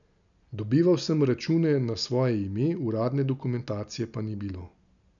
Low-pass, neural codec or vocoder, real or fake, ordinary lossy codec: 7.2 kHz; none; real; none